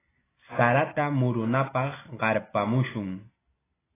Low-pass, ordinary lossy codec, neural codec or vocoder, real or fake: 3.6 kHz; AAC, 16 kbps; none; real